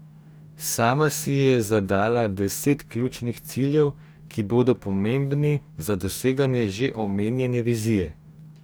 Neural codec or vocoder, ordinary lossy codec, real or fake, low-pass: codec, 44.1 kHz, 2.6 kbps, DAC; none; fake; none